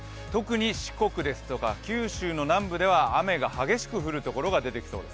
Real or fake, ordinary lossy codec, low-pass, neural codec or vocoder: real; none; none; none